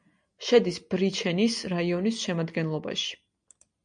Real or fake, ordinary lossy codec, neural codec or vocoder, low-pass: real; MP3, 64 kbps; none; 9.9 kHz